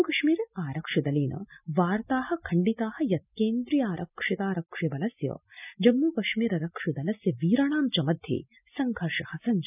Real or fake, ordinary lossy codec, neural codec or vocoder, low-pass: real; none; none; 3.6 kHz